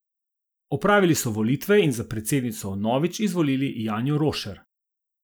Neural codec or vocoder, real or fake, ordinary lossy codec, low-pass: none; real; none; none